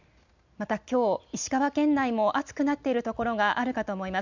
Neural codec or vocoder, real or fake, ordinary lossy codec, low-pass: none; real; none; 7.2 kHz